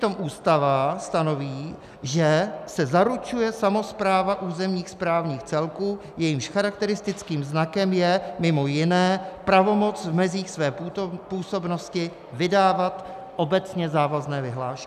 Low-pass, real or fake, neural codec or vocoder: 14.4 kHz; real; none